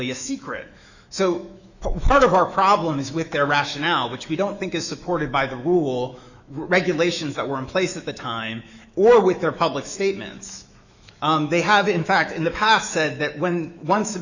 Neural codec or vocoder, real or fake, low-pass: autoencoder, 48 kHz, 128 numbers a frame, DAC-VAE, trained on Japanese speech; fake; 7.2 kHz